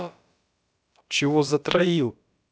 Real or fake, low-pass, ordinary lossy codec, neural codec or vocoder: fake; none; none; codec, 16 kHz, about 1 kbps, DyCAST, with the encoder's durations